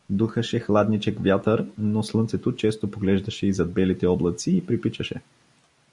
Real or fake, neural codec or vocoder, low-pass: real; none; 10.8 kHz